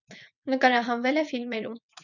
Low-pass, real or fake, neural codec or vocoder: 7.2 kHz; fake; vocoder, 22.05 kHz, 80 mel bands, WaveNeXt